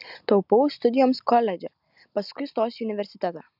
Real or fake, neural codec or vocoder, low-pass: real; none; 5.4 kHz